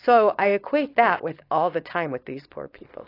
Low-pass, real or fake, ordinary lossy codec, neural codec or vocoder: 5.4 kHz; fake; AAC, 32 kbps; codec, 16 kHz, 4.8 kbps, FACodec